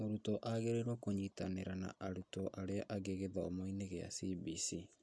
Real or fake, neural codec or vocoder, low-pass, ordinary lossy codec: real; none; none; none